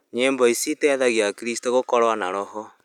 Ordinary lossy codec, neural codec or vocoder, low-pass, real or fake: none; none; 19.8 kHz; real